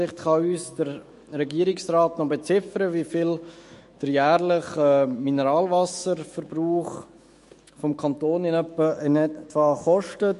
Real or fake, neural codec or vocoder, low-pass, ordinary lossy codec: fake; autoencoder, 48 kHz, 128 numbers a frame, DAC-VAE, trained on Japanese speech; 14.4 kHz; MP3, 48 kbps